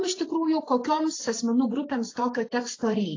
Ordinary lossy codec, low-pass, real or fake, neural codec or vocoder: AAC, 32 kbps; 7.2 kHz; fake; vocoder, 44.1 kHz, 128 mel bands every 512 samples, BigVGAN v2